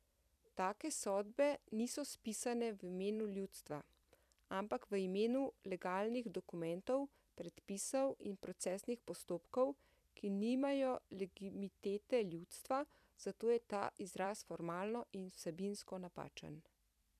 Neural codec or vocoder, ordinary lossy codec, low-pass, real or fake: vocoder, 44.1 kHz, 128 mel bands every 256 samples, BigVGAN v2; none; 14.4 kHz; fake